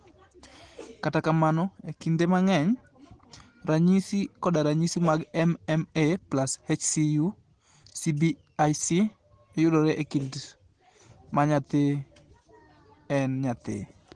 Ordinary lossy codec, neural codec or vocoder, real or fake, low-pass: Opus, 16 kbps; none; real; 9.9 kHz